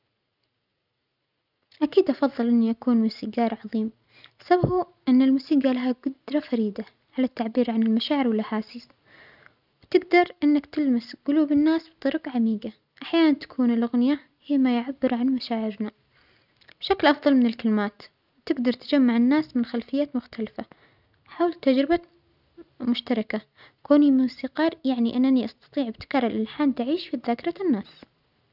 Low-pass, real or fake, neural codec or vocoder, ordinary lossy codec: 5.4 kHz; real; none; none